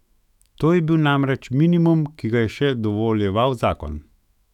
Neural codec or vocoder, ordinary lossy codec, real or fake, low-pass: autoencoder, 48 kHz, 128 numbers a frame, DAC-VAE, trained on Japanese speech; none; fake; 19.8 kHz